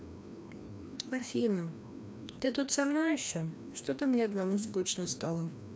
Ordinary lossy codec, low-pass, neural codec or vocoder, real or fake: none; none; codec, 16 kHz, 1 kbps, FreqCodec, larger model; fake